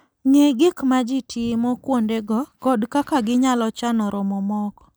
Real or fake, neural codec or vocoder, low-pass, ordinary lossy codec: real; none; none; none